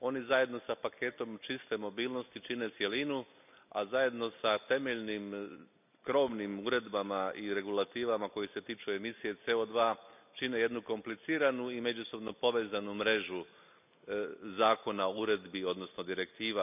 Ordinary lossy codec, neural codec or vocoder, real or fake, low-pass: none; none; real; 3.6 kHz